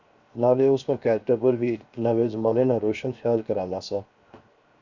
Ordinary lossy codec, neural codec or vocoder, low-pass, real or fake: Opus, 64 kbps; codec, 16 kHz, 0.7 kbps, FocalCodec; 7.2 kHz; fake